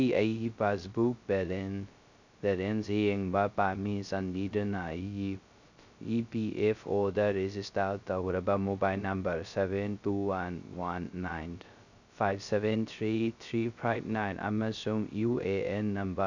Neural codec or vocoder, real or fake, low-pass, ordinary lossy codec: codec, 16 kHz, 0.2 kbps, FocalCodec; fake; 7.2 kHz; none